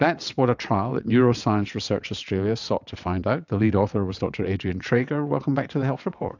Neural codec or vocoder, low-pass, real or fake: vocoder, 22.05 kHz, 80 mel bands, WaveNeXt; 7.2 kHz; fake